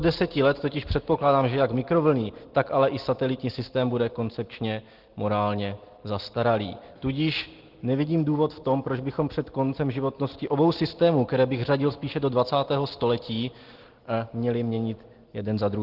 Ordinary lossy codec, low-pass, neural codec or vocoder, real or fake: Opus, 16 kbps; 5.4 kHz; none; real